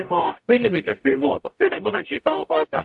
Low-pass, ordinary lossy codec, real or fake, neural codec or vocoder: 10.8 kHz; AAC, 64 kbps; fake; codec, 44.1 kHz, 0.9 kbps, DAC